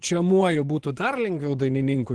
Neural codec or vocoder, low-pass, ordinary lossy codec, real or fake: vocoder, 24 kHz, 100 mel bands, Vocos; 10.8 kHz; Opus, 16 kbps; fake